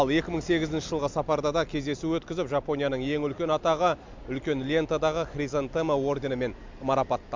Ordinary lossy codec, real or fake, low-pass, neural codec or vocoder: MP3, 64 kbps; real; 7.2 kHz; none